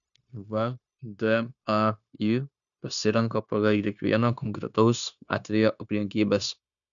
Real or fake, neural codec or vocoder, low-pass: fake; codec, 16 kHz, 0.9 kbps, LongCat-Audio-Codec; 7.2 kHz